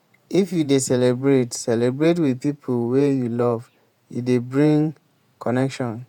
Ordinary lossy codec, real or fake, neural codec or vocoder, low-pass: none; fake; vocoder, 48 kHz, 128 mel bands, Vocos; 19.8 kHz